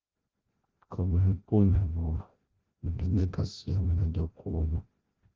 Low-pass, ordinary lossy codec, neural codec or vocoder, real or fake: 7.2 kHz; Opus, 16 kbps; codec, 16 kHz, 0.5 kbps, FreqCodec, larger model; fake